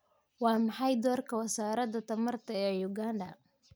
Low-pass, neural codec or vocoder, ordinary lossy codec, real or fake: none; none; none; real